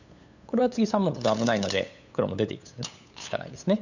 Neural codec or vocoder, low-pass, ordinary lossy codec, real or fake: codec, 16 kHz, 8 kbps, FunCodec, trained on LibriTTS, 25 frames a second; 7.2 kHz; none; fake